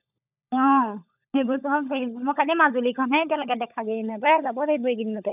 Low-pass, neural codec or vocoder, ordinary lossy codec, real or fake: 3.6 kHz; codec, 16 kHz, 16 kbps, FunCodec, trained on LibriTTS, 50 frames a second; none; fake